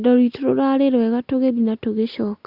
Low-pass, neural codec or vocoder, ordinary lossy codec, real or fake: 5.4 kHz; none; Opus, 64 kbps; real